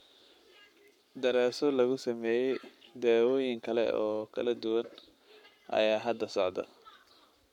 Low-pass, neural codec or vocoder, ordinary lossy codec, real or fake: 19.8 kHz; codec, 44.1 kHz, 7.8 kbps, Pupu-Codec; none; fake